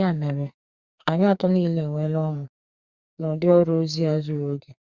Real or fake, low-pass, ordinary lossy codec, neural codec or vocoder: fake; 7.2 kHz; Opus, 64 kbps; codec, 44.1 kHz, 2.6 kbps, SNAC